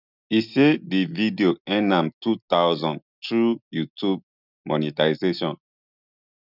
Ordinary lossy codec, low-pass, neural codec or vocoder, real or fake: none; 5.4 kHz; none; real